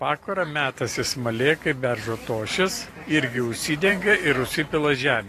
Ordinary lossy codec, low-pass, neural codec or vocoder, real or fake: AAC, 48 kbps; 14.4 kHz; vocoder, 44.1 kHz, 128 mel bands every 512 samples, BigVGAN v2; fake